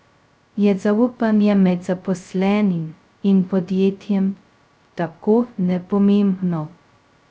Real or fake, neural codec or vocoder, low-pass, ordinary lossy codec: fake; codec, 16 kHz, 0.2 kbps, FocalCodec; none; none